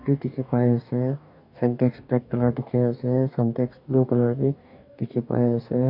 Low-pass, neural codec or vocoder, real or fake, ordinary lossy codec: 5.4 kHz; codec, 44.1 kHz, 2.6 kbps, DAC; fake; none